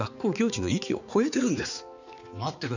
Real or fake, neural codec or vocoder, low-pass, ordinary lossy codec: fake; codec, 16 kHz, 4 kbps, X-Codec, HuBERT features, trained on balanced general audio; 7.2 kHz; none